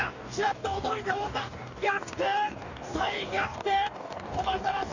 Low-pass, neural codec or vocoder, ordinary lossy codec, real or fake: 7.2 kHz; codec, 44.1 kHz, 2.6 kbps, DAC; none; fake